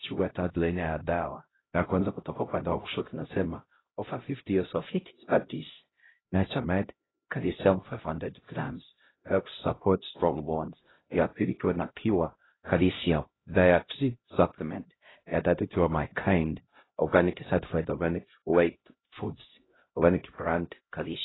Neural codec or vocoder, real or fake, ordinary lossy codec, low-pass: codec, 16 kHz, 0.5 kbps, X-Codec, HuBERT features, trained on LibriSpeech; fake; AAC, 16 kbps; 7.2 kHz